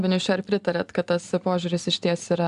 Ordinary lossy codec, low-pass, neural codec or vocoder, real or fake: MP3, 96 kbps; 10.8 kHz; none; real